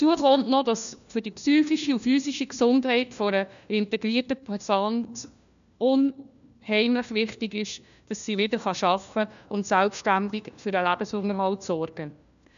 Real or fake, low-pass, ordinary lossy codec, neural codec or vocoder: fake; 7.2 kHz; none; codec, 16 kHz, 1 kbps, FunCodec, trained on LibriTTS, 50 frames a second